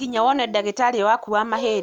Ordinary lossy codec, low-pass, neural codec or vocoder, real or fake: none; 19.8 kHz; none; real